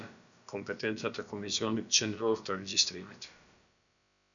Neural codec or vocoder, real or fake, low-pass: codec, 16 kHz, about 1 kbps, DyCAST, with the encoder's durations; fake; 7.2 kHz